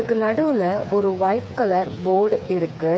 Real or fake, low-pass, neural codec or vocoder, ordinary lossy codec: fake; none; codec, 16 kHz, 4 kbps, FreqCodec, smaller model; none